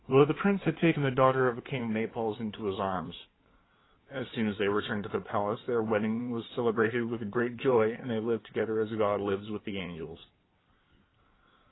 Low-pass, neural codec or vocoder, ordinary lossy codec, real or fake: 7.2 kHz; codec, 16 kHz in and 24 kHz out, 2.2 kbps, FireRedTTS-2 codec; AAC, 16 kbps; fake